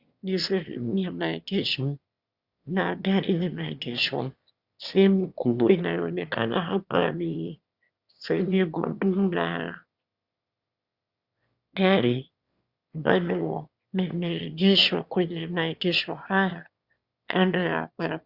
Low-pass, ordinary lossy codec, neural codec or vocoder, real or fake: 5.4 kHz; Opus, 64 kbps; autoencoder, 22.05 kHz, a latent of 192 numbers a frame, VITS, trained on one speaker; fake